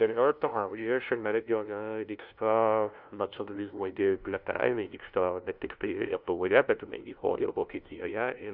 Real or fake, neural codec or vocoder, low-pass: fake; codec, 16 kHz, 0.5 kbps, FunCodec, trained on LibriTTS, 25 frames a second; 5.4 kHz